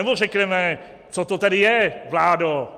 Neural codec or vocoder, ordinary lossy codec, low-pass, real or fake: none; Opus, 32 kbps; 14.4 kHz; real